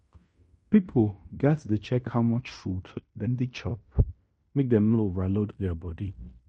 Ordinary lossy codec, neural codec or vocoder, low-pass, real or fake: MP3, 48 kbps; codec, 16 kHz in and 24 kHz out, 0.9 kbps, LongCat-Audio-Codec, fine tuned four codebook decoder; 10.8 kHz; fake